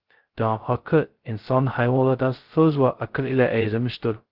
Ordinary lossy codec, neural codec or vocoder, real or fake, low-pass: Opus, 16 kbps; codec, 16 kHz, 0.2 kbps, FocalCodec; fake; 5.4 kHz